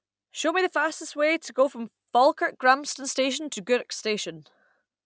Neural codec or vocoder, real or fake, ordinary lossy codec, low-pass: none; real; none; none